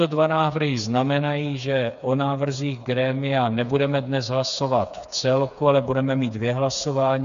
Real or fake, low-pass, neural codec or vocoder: fake; 7.2 kHz; codec, 16 kHz, 4 kbps, FreqCodec, smaller model